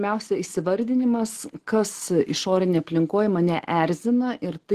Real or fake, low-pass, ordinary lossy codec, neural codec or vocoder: real; 14.4 kHz; Opus, 16 kbps; none